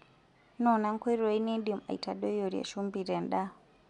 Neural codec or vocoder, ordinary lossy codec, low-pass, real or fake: none; none; 10.8 kHz; real